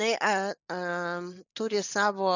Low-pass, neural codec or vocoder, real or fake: 7.2 kHz; none; real